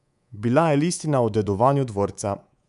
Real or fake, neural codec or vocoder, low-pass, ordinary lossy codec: fake; codec, 24 kHz, 3.1 kbps, DualCodec; 10.8 kHz; none